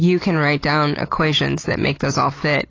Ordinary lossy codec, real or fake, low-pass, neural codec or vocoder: AAC, 32 kbps; real; 7.2 kHz; none